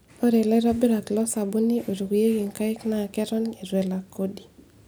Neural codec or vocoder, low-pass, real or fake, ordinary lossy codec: none; none; real; none